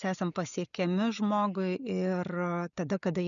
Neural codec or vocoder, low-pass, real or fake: none; 7.2 kHz; real